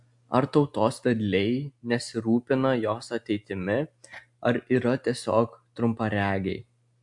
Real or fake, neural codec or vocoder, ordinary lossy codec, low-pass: real; none; AAC, 64 kbps; 10.8 kHz